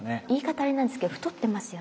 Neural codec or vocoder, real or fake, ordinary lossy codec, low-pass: none; real; none; none